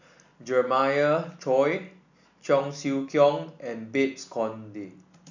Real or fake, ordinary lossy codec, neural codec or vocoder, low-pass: real; none; none; 7.2 kHz